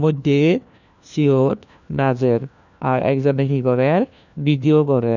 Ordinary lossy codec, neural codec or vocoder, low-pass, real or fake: none; codec, 16 kHz, 1 kbps, FunCodec, trained on LibriTTS, 50 frames a second; 7.2 kHz; fake